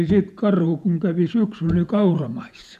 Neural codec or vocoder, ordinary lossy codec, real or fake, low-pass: vocoder, 48 kHz, 128 mel bands, Vocos; none; fake; 14.4 kHz